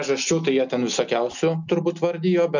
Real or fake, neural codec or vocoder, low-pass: real; none; 7.2 kHz